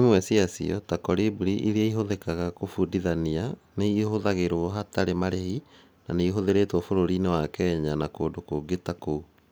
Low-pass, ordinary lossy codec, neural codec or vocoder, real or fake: none; none; none; real